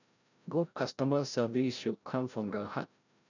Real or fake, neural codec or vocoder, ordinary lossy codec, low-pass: fake; codec, 16 kHz, 0.5 kbps, FreqCodec, larger model; none; 7.2 kHz